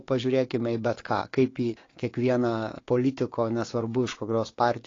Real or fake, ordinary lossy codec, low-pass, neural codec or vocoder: fake; AAC, 32 kbps; 7.2 kHz; codec, 16 kHz, 6 kbps, DAC